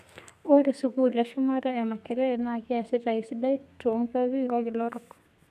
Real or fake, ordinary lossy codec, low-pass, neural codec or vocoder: fake; none; 14.4 kHz; codec, 32 kHz, 1.9 kbps, SNAC